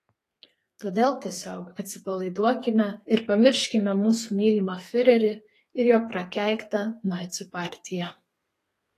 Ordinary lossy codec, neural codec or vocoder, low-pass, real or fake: AAC, 48 kbps; codec, 32 kHz, 1.9 kbps, SNAC; 14.4 kHz; fake